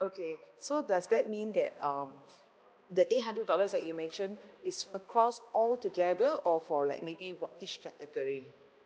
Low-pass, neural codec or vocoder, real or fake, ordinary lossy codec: none; codec, 16 kHz, 1 kbps, X-Codec, HuBERT features, trained on balanced general audio; fake; none